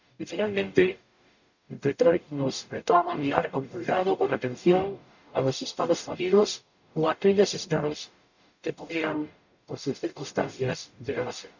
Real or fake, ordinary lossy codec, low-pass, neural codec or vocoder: fake; none; 7.2 kHz; codec, 44.1 kHz, 0.9 kbps, DAC